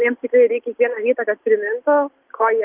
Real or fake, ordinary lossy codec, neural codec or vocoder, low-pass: real; Opus, 32 kbps; none; 3.6 kHz